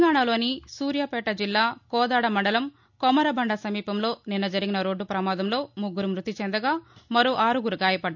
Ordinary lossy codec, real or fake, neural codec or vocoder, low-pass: none; real; none; 7.2 kHz